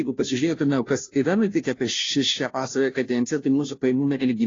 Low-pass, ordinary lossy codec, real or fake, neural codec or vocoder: 7.2 kHz; AAC, 32 kbps; fake; codec, 16 kHz, 0.5 kbps, FunCodec, trained on Chinese and English, 25 frames a second